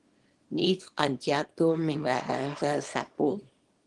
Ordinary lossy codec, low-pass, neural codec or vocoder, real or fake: Opus, 24 kbps; 10.8 kHz; codec, 24 kHz, 0.9 kbps, WavTokenizer, small release; fake